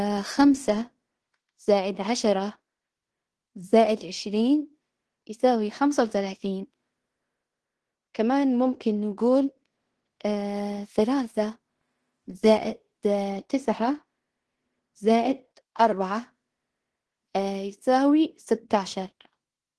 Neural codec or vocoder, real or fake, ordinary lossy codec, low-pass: codec, 16 kHz in and 24 kHz out, 0.9 kbps, LongCat-Audio-Codec, fine tuned four codebook decoder; fake; Opus, 16 kbps; 10.8 kHz